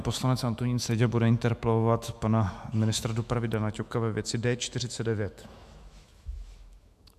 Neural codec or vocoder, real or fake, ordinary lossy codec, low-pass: autoencoder, 48 kHz, 128 numbers a frame, DAC-VAE, trained on Japanese speech; fake; MP3, 96 kbps; 14.4 kHz